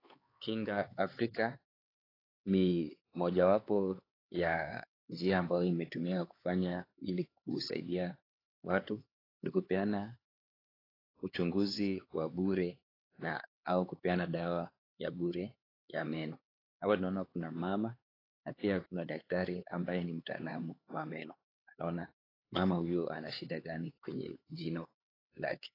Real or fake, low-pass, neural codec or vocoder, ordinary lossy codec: fake; 5.4 kHz; codec, 16 kHz, 4 kbps, X-Codec, HuBERT features, trained on LibriSpeech; AAC, 24 kbps